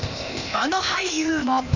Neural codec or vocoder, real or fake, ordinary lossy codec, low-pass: codec, 16 kHz, 0.8 kbps, ZipCodec; fake; none; 7.2 kHz